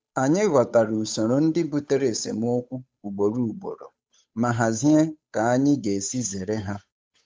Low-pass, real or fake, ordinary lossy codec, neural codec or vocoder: none; fake; none; codec, 16 kHz, 8 kbps, FunCodec, trained on Chinese and English, 25 frames a second